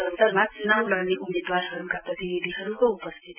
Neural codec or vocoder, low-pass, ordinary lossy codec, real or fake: none; 3.6 kHz; none; real